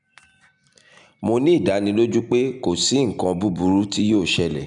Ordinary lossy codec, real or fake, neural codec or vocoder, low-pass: none; real; none; 9.9 kHz